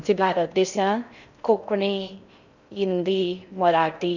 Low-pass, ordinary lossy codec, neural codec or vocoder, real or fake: 7.2 kHz; none; codec, 16 kHz in and 24 kHz out, 0.6 kbps, FocalCodec, streaming, 2048 codes; fake